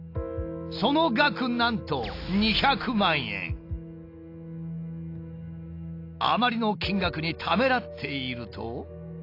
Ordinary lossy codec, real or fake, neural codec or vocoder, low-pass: AAC, 32 kbps; real; none; 5.4 kHz